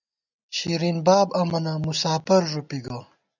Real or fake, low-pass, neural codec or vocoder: real; 7.2 kHz; none